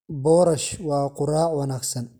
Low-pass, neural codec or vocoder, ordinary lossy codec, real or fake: none; none; none; real